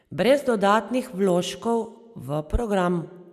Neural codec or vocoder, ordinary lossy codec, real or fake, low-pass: none; none; real; 14.4 kHz